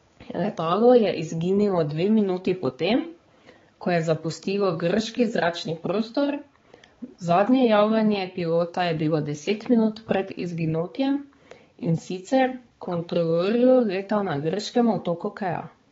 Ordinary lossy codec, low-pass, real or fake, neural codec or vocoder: AAC, 24 kbps; 7.2 kHz; fake; codec, 16 kHz, 4 kbps, X-Codec, HuBERT features, trained on balanced general audio